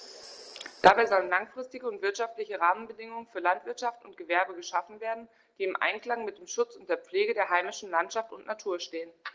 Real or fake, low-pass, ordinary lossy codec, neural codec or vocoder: real; 7.2 kHz; Opus, 16 kbps; none